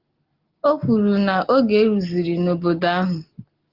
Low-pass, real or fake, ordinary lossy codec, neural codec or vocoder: 5.4 kHz; real; Opus, 16 kbps; none